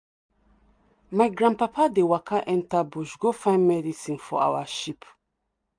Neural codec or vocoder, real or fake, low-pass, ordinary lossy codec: none; real; 9.9 kHz; MP3, 64 kbps